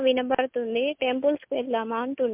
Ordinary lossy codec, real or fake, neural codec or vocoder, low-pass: MP3, 32 kbps; real; none; 3.6 kHz